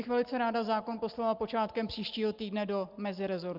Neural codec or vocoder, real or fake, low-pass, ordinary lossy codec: none; real; 5.4 kHz; Opus, 24 kbps